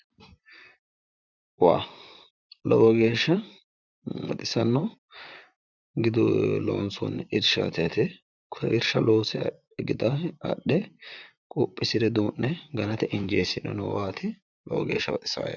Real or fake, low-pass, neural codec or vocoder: fake; 7.2 kHz; vocoder, 44.1 kHz, 128 mel bands, Pupu-Vocoder